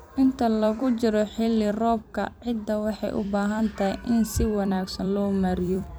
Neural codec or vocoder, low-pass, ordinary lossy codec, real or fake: vocoder, 44.1 kHz, 128 mel bands every 256 samples, BigVGAN v2; none; none; fake